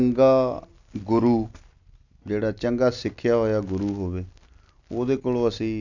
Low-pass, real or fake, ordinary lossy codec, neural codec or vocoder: 7.2 kHz; real; none; none